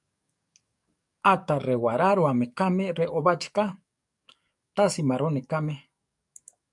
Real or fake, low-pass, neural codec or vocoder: fake; 10.8 kHz; codec, 44.1 kHz, 7.8 kbps, DAC